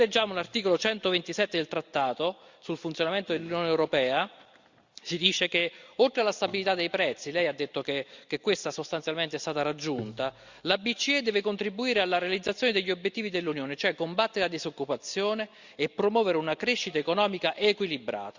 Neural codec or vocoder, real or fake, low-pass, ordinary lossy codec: none; real; 7.2 kHz; Opus, 64 kbps